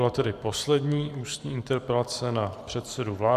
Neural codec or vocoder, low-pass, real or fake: vocoder, 44.1 kHz, 128 mel bands every 512 samples, BigVGAN v2; 14.4 kHz; fake